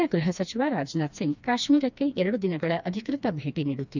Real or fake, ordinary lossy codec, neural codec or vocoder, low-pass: fake; none; codec, 16 kHz, 2 kbps, FreqCodec, smaller model; 7.2 kHz